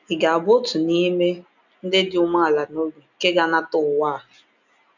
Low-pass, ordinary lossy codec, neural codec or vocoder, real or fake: 7.2 kHz; none; none; real